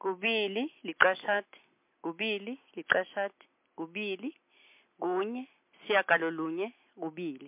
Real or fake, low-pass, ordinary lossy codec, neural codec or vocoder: real; 3.6 kHz; MP3, 32 kbps; none